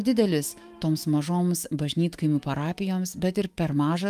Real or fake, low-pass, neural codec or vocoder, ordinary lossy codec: fake; 14.4 kHz; autoencoder, 48 kHz, 128 numbers a frame, DAC-VAE, trained on Japanese speech; Opus, 32 kbps